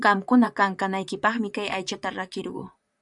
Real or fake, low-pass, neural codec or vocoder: fake; 10.8 kHz; vocoder, 44.1 kHz, 128 mel bands, Pupu-Vocoder